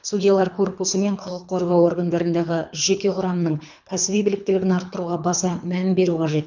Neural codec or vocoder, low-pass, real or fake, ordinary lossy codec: codec, 24 kHz, 3 kbps, HILCodec; 7.2 kHz; fake; none